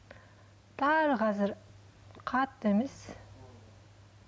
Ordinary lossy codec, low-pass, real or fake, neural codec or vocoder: none; none; real; none